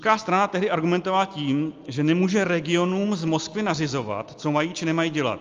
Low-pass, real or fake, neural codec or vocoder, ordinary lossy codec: 7.2 kHz; real; none; Opus, 32 kbps